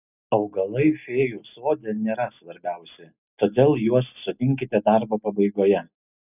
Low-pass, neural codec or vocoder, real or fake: 3.6 kHz; none; real